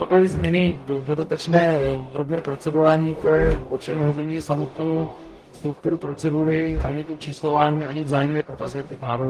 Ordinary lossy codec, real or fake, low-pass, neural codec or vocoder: Opus, 24 kbps; fake; 14.4 kHz; codec, 44.1 kHz, 0.9 kbps, DAC